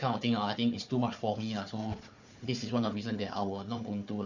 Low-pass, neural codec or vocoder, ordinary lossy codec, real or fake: 7.2 kHz; codec, 16 kHz, 4 kbps, FunCodec, trained on Chinese and English, 50 frames a second; none; fake